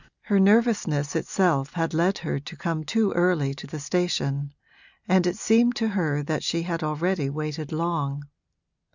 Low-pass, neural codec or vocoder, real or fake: 7.2 kHz; none; real